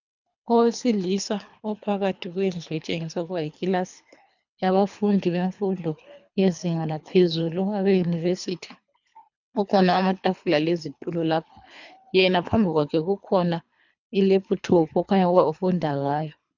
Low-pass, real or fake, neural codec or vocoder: 7.2 kHz; fake; codec, 24 kHz, 3 kbps, HILCodec